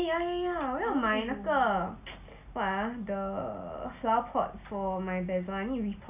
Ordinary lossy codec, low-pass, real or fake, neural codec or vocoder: AAC, 32 kbps; 3.6 kHz; real; none